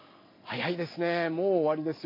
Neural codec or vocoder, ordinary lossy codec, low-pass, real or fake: none; MP3, 32 kbps; 5.4 kHz; real